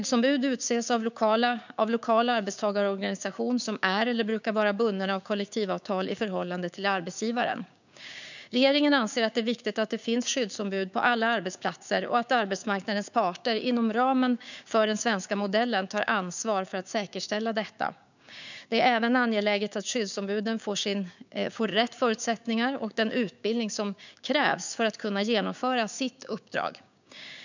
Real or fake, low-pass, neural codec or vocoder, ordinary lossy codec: fake; 7.2 kHz; codec, 16 kHz, 6 kbps, DAC; none